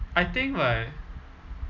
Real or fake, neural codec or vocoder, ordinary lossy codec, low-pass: real; none; none; 7.2 kHz